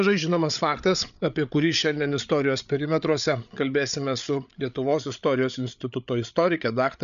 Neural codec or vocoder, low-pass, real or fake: codec, 16 kHz, 8 kbps, FreqCodec, larger model; 7.2 kHz; fake